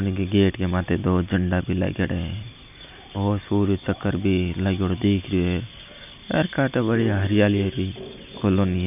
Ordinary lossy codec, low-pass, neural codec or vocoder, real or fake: none; 3.6 kHz; none; real